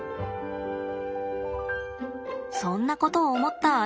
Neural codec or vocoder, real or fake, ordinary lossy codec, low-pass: none; real; none; none